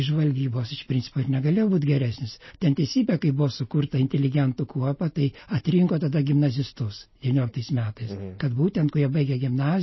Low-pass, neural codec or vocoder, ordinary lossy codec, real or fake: 7.2 kHz; none; MP3, 24 kbps; real